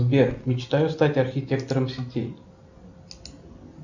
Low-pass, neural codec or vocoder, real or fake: 7.2 kHz; none; real